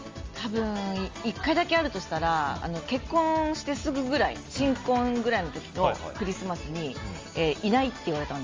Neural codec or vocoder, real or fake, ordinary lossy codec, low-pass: none; real; Opus, 32 kbps; 7.2 kHz